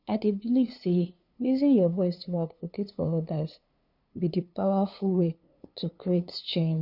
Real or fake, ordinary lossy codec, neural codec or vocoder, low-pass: fake; none; codec, 16 kHz, 2 kbps, FunCodec, trained on LibriTTS, 25 frames a second; 5.4 kHz